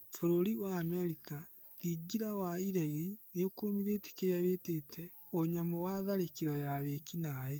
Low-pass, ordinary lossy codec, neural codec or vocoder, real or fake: none; none; codec, 44.1 kHz, 7.8 kbps, DAC; fake